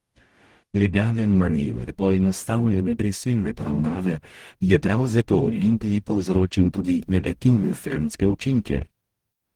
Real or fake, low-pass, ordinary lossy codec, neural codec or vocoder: fake; 19.8 kHz; Opus, 24 kbps; codec, 44.1 kHz, 0.9 kbps, DAC